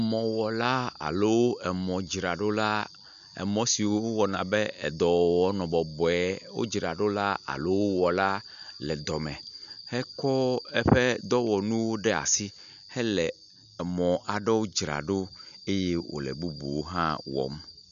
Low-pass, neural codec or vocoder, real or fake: 7.2 kHz; none; real